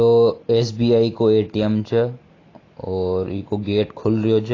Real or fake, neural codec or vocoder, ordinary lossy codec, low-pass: real; none; AAC, 32 kbps; 7.2 kHz